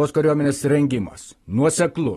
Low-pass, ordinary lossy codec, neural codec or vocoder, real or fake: 19.8 kHz; AAC, 32 kbps; none; real